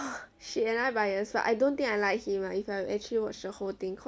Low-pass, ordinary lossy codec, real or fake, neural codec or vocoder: none; none; real; none